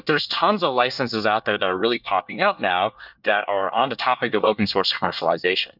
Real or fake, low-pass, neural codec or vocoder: fake; 5.4 kHz; codec, 24 kHz, 1 kbps, SNAC